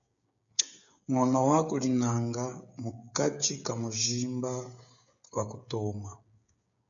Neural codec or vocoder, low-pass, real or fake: codec, 16 kHz, 16 kbps, FreqCodec, smaller model; 7.2 kHz; fake